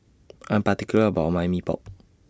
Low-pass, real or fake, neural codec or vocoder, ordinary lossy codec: none; real; none; none